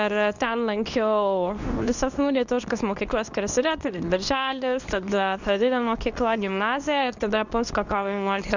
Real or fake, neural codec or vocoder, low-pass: fake; codec, 24 kHz, 0.9 kbps, WavTokenizer, medium speech release version 2; 7.2 kHz